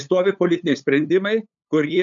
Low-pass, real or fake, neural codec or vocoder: 7.2 kHz; fake; codec, 16 kHz, 8 kbps, FunCodec, trained on LibriTTS, 25 frames a second